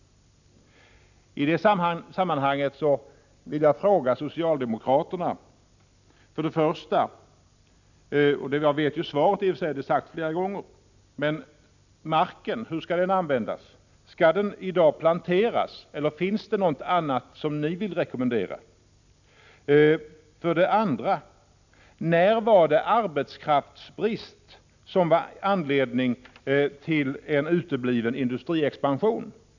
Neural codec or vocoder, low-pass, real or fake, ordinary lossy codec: none; 7.2 kHz; real; none